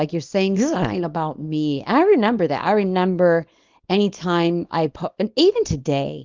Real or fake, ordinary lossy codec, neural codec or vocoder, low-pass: fake; Opus, 32 kbps; codec, 24 kHz, 0.9 kbps, WavTokenizer, small release; 7.2 kHz